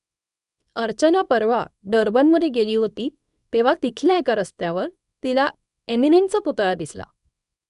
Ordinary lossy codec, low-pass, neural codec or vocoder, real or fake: none; 10.8 kHz; codec, 24 kHz, 0.9 kbps, WavTokenizer, small release; fake